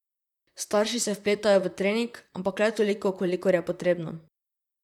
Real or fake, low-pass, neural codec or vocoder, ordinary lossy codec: fake; 19.8 kHz; vocoder, 44.1 kHz, 128 mel bands, Pupu-Vocoder; none